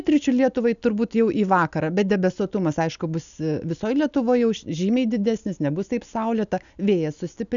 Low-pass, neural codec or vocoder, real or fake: 7.2 kHz; none; real